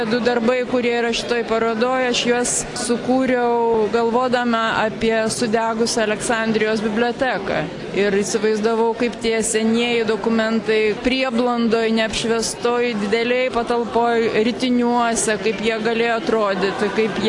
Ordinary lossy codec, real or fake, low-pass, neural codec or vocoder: AAC, 48 kbps; real; 10.8 kHz; none